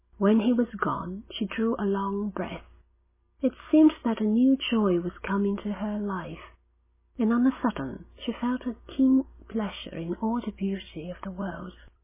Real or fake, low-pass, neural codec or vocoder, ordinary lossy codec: real; 3.6 kHz; none; MP3, 16 kbps